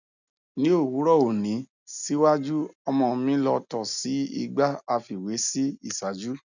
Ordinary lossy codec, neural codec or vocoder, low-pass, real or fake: none; none; 7.2 kHz; real